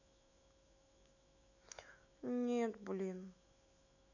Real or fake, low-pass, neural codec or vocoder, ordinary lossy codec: fake; 7.2 kHz; autoencoder, 48 kHz, 128 numbers a frame, DAC-VAE, trained on Japanese speech; none